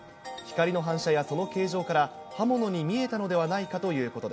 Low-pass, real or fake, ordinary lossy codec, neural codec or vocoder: none; real; none; none